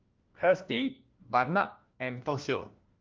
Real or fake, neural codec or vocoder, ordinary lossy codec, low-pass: fake; codec, 16 kHz, 1 kbps, FunCodec, trained on LibriTTS, 50 frames a second; Opus, 24 kbps; 7.2 kHz